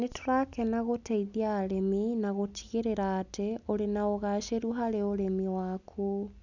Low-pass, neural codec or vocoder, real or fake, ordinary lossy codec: 7.2 kHz; none; real; none